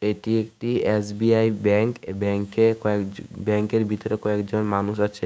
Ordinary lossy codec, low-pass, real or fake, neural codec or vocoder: none; none; fake; codec, 16 kHz, 6 kbps, DAC